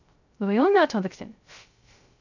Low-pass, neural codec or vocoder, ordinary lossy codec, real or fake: 7.2 kHz; codec, 16 kHz, 0.3 kbps, FocalCodec; none; fake